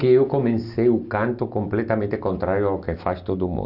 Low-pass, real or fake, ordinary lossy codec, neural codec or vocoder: 5.4 kHz; real; none; none